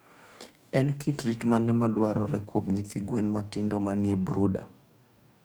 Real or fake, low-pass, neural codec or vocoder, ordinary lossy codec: fake; none; codec, 44.1 kHz, 2.6 kbps, DAC; none